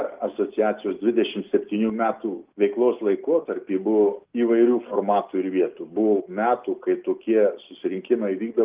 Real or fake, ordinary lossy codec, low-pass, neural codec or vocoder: real; Opus, 32 kbps; 3.6 kHz; none